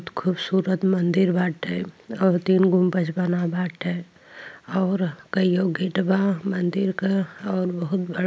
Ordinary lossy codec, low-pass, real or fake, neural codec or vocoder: none; none; real; none